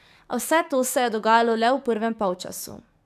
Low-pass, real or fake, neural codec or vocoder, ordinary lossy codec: 14.4 kHz; fake; codec, 44.1 kHz, 7.8 kbps, DAC; none